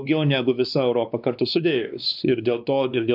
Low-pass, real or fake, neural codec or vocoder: 5.4 kHz; fake; codec, 16 kHz, 2 kbps, X-Codec, WavLM features, trained on Multilingual LibriSpeech